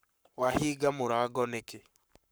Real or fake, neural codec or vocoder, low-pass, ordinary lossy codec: fake; codec, 44.1 kHz, 7.8 kbps, Pupu-Codec; none; none